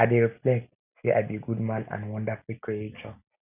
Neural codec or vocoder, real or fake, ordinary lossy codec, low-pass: none; real; none; 3.6 kHz